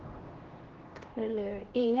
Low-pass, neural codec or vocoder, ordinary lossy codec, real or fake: 7.2 kHz; codec, 16 kHz, 1 kbps, X-Codec, HuBERT features, trained on LibriSpeech; Opus, 16 kbps; fake